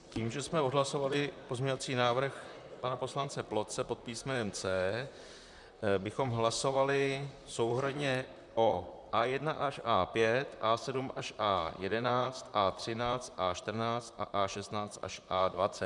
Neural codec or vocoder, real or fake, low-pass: vocoder, 44.1 kHz, 128 mel bands, Pupu-Vocoder; fake; 10.8 kHz